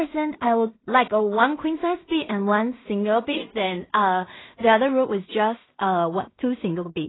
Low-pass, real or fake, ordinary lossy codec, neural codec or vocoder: 7.2 kHz; fake; AAC, 16 kbps; codec, 16 kHz in and 24 kHz out, 0.4 kbps, LongCat-Audio-Codec, two codebook decoder